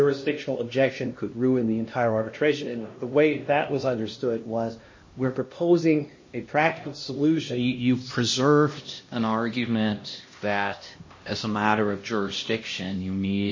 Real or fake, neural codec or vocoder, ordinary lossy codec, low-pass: fake; codec, 16 kHz, 1 kbps, X-Codec, HuBERT features, trained on LibriSpeech; MP3, 32 kbps; 7.2 kHz